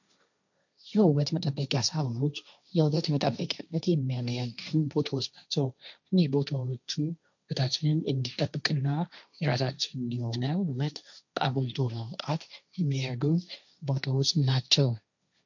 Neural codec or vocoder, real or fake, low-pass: codec, 16 kHz, 1.1 kbps, Voila-Tokenizer; fake; 7.2 kHz